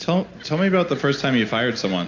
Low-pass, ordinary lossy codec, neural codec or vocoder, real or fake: 7.2 kHz; AAC, 48 kbps; none; real